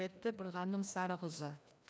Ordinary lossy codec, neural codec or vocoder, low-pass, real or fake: none; codec, 16 kHz, 1 kbps, FreqCodec, larger model; none; fake